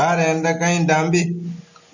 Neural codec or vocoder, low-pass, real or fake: none; 7.2 kHz; real